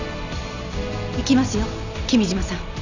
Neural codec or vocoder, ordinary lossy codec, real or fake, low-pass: none; none; real; 7.2 kHz